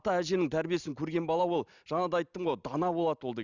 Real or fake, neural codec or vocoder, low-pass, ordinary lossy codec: real; none; 7.2 kHz; Opus, 64 kbps